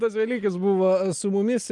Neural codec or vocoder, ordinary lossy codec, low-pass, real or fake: none; Opus, 32 kbps; 10.8 kHz; real